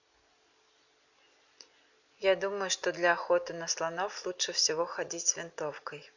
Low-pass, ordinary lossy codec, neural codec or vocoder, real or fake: 7.2 kHz; none; none; real